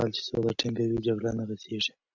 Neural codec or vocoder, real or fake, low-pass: none; real; 7.2 kHz